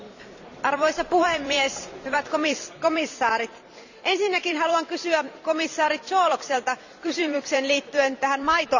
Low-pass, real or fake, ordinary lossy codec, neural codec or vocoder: 7.2 kHz; fake; none; vocoder, 44.1 kHz, 128 mel bands every 256 samples, BigVGAN v2